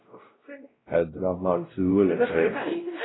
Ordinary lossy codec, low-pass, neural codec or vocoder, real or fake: AAC, 16 kbps; 7.2 kHz; codec, 16 kHz, 0.5 kbps, X-Codec, WavLM features, trained on Multilingual LibriSpeech; fake